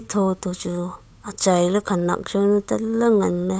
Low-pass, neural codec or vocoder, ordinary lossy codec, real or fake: none; codec, 16 kHz, 8 kbps, FunCodec, trained on Chinese and English, 25 frames a second; none; fake